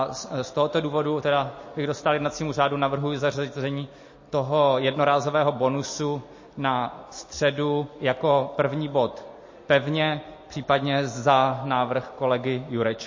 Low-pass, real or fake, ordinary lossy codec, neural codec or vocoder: 7.2 kHz; real; MP3, 32 kbps; none